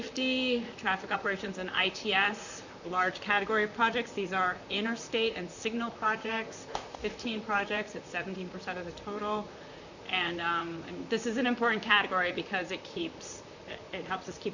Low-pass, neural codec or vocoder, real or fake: 7.2 kHz; vocoder, 44.1 kHz, 128 mel bands, Pupu-Vocoder; fake